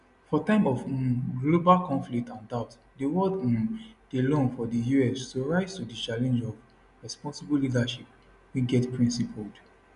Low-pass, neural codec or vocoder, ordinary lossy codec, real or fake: 10.8 kHz; none; none; real